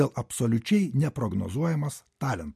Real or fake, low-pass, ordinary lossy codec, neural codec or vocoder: real; 14.4 kHz; MP3, 64 kbps; none